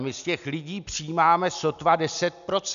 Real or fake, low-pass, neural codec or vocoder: real; 7.2 kHz; none